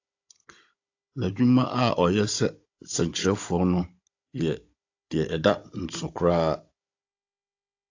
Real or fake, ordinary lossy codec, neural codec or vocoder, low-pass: fake; AAC, 48 kbps; codec, 16 kHz, 16 kbps, FunCodec, trained on Chinese and English, 50 frames a second; 7.2 kHz